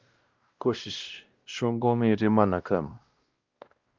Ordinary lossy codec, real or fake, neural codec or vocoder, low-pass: Opus, 32 kbps; fake; codec, 16 kHz, 1 kbps, X-Codec, WavLM features, trained on Multilingual LibriSpeech; 7.2 kHz